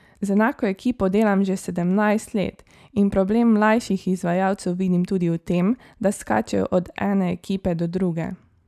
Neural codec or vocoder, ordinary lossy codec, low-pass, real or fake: none; none; 14.4 kHz; real